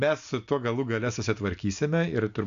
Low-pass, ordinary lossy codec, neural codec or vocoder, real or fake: 7.2 kHz; AAC, 96 kbps; none; real